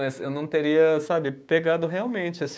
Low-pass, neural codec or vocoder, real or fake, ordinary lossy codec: none; codec, 16 kHz, 6 kbps, DAC; fake; none